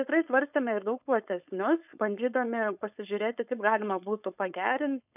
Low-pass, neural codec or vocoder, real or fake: 3.6 kHz; codec, 16 kHz, 4.8 kbps, FACodec; fake